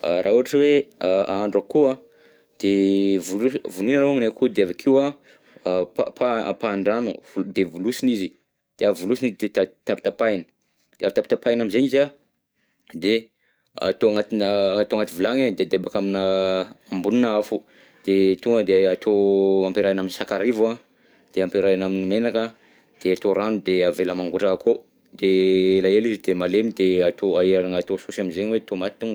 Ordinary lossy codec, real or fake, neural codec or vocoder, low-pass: none; fake; codec, 44.1 kHz, 7.8 kbps, DAC; none